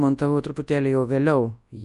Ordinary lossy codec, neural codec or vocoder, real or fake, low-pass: MP3, 64 kbps; codec, 24 kHz, 0.9 kbps, WavTokenizer, large speech release; fake; 10.8 kHz